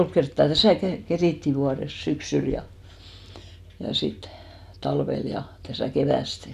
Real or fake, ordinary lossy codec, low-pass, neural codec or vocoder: real; none; 14.4 kHz; none